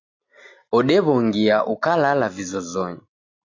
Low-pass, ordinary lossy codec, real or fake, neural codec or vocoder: 7.2 kHz; AAC, 48 kbps; real; none